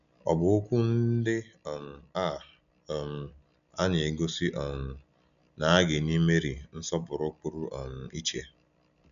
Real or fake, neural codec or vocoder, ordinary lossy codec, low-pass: real; none; none; 7.2 kHz